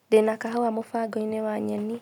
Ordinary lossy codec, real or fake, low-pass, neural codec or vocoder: none; real; 19.8 kHz; none